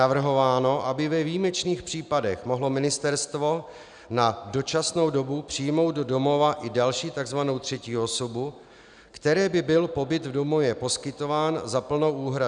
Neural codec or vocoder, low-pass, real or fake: none; 9.9 kHz; real